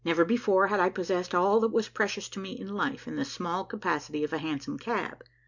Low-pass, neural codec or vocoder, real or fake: 7.2 kHz; none; real